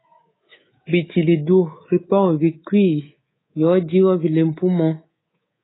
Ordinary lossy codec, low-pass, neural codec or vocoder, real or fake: AAC, 16 kbps; 7.2 kHz; codec, 24 kHz, 3.1 kbps, DualCodec; fake